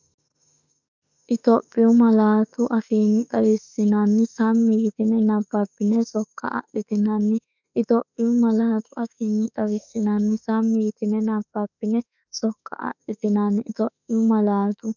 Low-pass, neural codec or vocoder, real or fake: 7.2 kHz; autoencoder, 48 kHz, 32 numbers a frame, DAC-VAE, trained on Japanese speech; fake